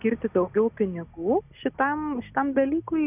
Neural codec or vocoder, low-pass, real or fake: none; 3.6 kHz; real